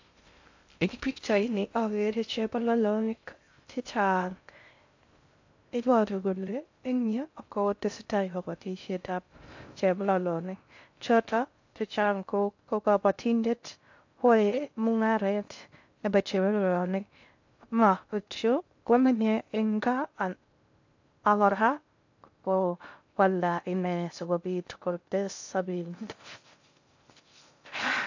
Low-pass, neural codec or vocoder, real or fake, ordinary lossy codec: 7.2 kHz; codec, 16 kHz in and 24 kHz out, 0.6 kbps, FocalCodec, streaming, 2048 codes; fake; AAC, 48 kbps